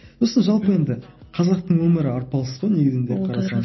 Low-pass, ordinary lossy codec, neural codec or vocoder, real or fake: 7.2 kHz; MP3, 24 kbps; none; real